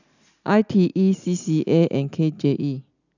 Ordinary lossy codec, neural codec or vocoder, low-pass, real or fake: none; none; 7.2 kHz; real